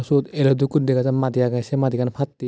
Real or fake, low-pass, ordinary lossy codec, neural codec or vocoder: real; none; none; none